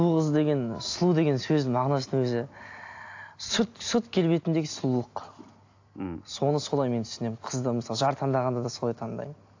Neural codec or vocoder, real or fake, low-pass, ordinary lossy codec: none; real; 7.2 kHz; AAC, 48 kbps